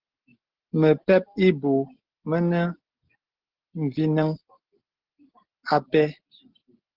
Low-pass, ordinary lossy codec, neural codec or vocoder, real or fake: 5.4 kHz; Opus, 16 kbps; none; real